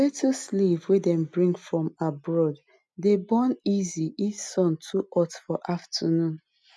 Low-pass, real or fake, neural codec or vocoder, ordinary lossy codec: none; real; none; none